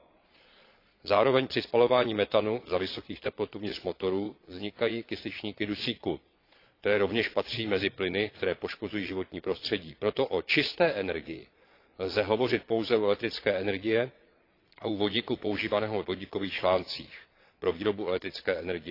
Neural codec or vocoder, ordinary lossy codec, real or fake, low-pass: vocoder, 22.05 kHz, 80 mel bands, Vocos; AAC, 32 kbps; fake; 5.4 kHz